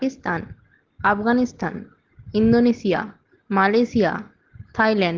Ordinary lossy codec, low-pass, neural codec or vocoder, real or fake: Opus, 16 kbps; 7.2 kHz; none; real